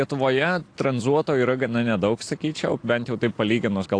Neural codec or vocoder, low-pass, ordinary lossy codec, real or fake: none; 9.9 kHz; AAC, 48 kbps; real